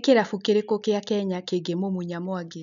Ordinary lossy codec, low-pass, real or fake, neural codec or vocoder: none; 7.2 kHz; real; none